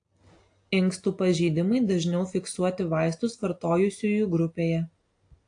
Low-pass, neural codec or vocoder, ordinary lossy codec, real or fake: 9.9 kHz; none; AAC, 48 kbps; real